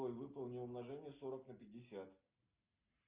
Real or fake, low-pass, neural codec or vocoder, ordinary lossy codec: real; 3.6 kHz; none; Opus, 32 kbps